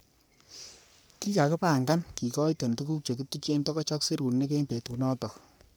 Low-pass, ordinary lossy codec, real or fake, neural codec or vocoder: none; none; fake; codec, 44.1 kHz, 3.4 kbps, Pupu-Codec